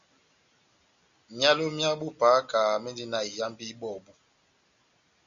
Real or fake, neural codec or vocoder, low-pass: real; none; 7.2 kHz